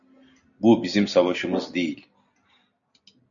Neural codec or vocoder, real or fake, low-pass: none; real; 7.2 kHz